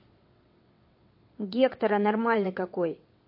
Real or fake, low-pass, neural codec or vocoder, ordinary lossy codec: fake; 5.4 kHz; vocoder, 22.05 kHz, 80 mel bands, WaveNeXt; MP3, 32 kbps